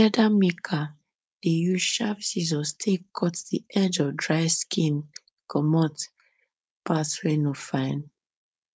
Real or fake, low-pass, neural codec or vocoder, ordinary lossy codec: fake; none; codec, 16 kHz, 4.8 kbps, FACodec; none